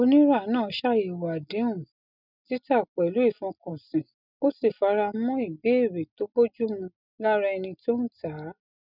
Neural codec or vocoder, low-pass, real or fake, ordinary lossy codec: none; 5.4 kHz; real; none